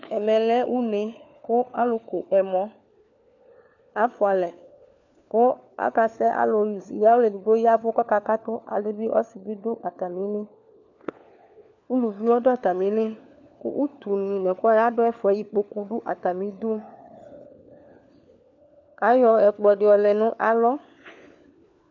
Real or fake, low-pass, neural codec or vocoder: fake; 7.2 kHz; codec, 16 kHz, 4 kbps, FunCodec, trained on LibriTTS, 50 frames a second